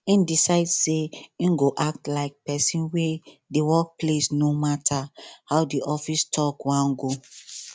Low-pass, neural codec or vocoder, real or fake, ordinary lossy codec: none; none; real; none